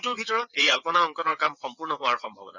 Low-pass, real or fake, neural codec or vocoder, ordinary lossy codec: 7.2 kHz; fake; vocoder, 22.05 kHz, 80 mel bands, WaveNeXt; none